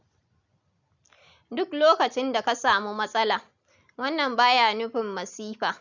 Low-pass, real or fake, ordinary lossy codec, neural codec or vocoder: 7.2 kHz; real; none; none